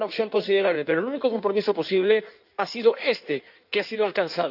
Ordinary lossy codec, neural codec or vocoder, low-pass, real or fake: none; codec, 16 kHz in and 24 kHz out, 1.1 kbps, FireRedTTS-2 codec; 5.4 kHz; fake